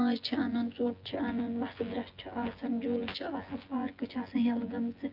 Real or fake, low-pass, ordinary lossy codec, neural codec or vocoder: fake; 5.4 kHz; Opus, 24 kbps; vocoder, 24 kHz, 100 mel bands, Vocos